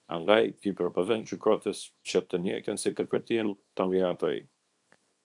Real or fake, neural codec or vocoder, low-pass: fake; codec, 24 kHz, 0.9 kbps, WavTokenizer, small release; 10.8 kHz